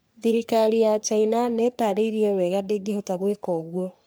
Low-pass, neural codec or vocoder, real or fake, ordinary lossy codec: none; codec, 44.1 kHz, 3.4 kbps, Pupu-Codec; fake; none